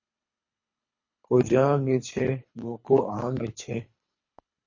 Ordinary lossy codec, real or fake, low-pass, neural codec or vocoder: MP3, 32 kbps; fake; 7.2 kHz; codec, 24 kHz, 3 kbps, HILCodec